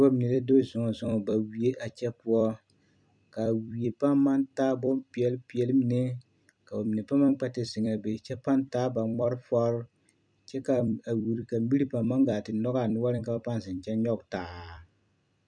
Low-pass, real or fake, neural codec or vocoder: 9.9 kHz; fake; vocoder, 44.1 kHz, 128 mel bands every 256 samples, BigVGAN v2